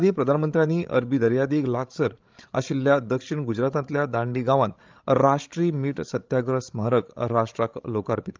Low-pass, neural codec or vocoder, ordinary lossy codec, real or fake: 7.2 kHz; codec, 16 kHz, 16 kbps, FreqCodec, larger model; Opus, 24 kbps; fake